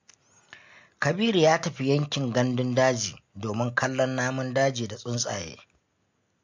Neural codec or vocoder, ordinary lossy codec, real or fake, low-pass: none; MP3, 48 kbps; real; 7.2 kHz